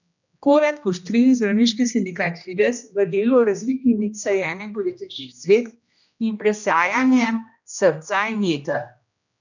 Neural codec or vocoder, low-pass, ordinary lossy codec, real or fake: codec, 16 kHz, 1 kbps, X-Codec, HuBERT features, trained on general audio; 7.2 kHz; none; fake